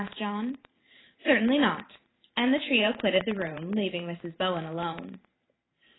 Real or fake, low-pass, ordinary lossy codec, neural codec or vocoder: fake; 7.2 kHz; AAC, 16 kbps; vocoder, 44.1 kHz, 128 mel bands every 512 samples, BigVGAN v2